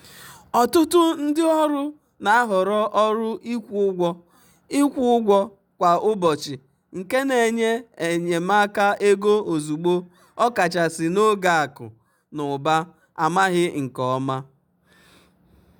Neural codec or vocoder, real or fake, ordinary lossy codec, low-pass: none; real; none; none